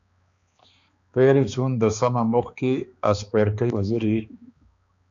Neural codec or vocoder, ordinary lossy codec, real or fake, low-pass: codec, 16 kHz, 2 kbps, X-Codec, HuBERT features, trained on balanced general audio; MP3, 64 kbps; fake; 7.2 kHz